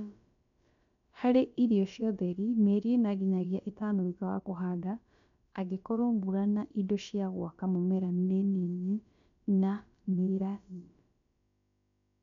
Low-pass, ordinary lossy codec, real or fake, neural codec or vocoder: 7.2 kHz; MP3, 64 kbps; fake; codec, 16 kHz, about 1 kbps, DyCAST, with the encoder's durations